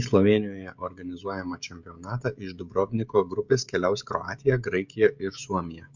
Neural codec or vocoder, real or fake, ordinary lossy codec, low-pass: codec, 16 kHz, 16 kbps, FreqCodec, smaller model; fake; MP3, 64 kbps; 7.2 kHz